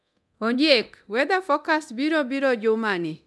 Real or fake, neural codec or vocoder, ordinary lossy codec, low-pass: fake; codec, 24 kHz, 0.9 kbps, DualCodec; none; none